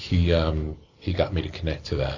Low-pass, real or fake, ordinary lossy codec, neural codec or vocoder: 7.2 kHz; real; AAC, 48 kbps; none